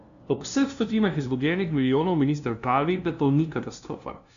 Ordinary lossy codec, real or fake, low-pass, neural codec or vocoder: none; fake; 7.2 kHz; codec, 16 kHz, 0.5 kbps, FunCodec, trained on LibriTTS, 25 frames a second